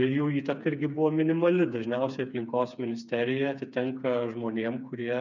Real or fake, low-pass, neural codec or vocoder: fake; 7.2 kHz; codec, 16 kHz, 4 kbps, FreqCodec, smaller model